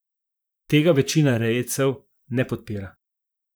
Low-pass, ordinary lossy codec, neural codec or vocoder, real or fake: none; none; vocoder, 44.1 kHz, 128 mel bands, Pupu-Vocoder; fake